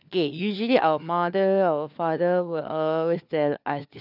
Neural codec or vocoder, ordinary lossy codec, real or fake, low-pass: codec, 16 kHz, 4 kbps, FunCodec, trained on LibriTTS, 50 frames a second; none; fake; 5.4 kHz